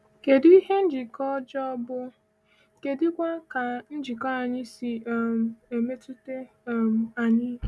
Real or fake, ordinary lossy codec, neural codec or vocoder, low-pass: real; none; none; none